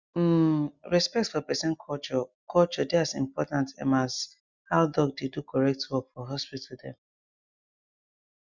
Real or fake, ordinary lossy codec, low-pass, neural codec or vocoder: real; Opus, 64 kbps; 7.2 kHz; none